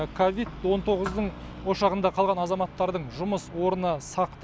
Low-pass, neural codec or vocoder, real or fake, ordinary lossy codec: none; none; real; none